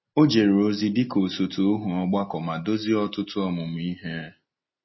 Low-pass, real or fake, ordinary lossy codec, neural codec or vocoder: 7.2 kHz; real; MP3, 24 kbps; none